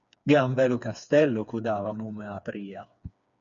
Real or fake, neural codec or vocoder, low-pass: fake; codec, 16 kHz, 4 kbps, FreqCodec, smaller model; 7.2 kHz